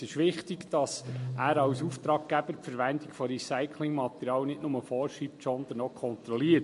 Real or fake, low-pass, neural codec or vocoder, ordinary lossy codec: real; 14.4 kHz; none; MP3, 48 kbps